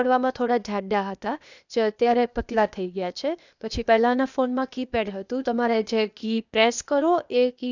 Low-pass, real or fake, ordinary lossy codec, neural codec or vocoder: 7.2 kHz; fake; none; codec, 16 kHz, 0.8 kbps, ZipCodec